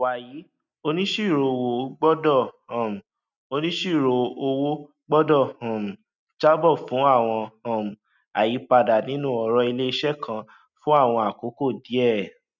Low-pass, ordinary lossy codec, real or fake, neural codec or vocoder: 7.2 kHz; none; real; none